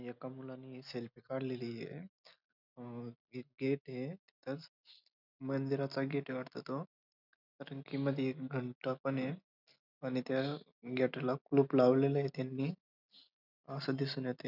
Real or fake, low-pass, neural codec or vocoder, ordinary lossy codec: real; 5.4 kHz; none; none